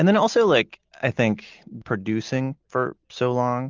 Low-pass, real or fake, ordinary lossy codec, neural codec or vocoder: 7.2 kHz; real; Opus, 32 kbps; none